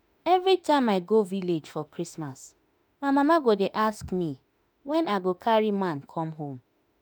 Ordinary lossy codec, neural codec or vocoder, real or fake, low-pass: none; autoencoder, 48 kHz, 32 numbers a frame, DAC-VAE, trained on Japanese speech; fake; none